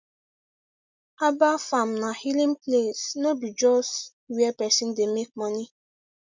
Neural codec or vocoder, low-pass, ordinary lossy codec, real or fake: none; 7.2 kHz; MP3, 64 kbps; real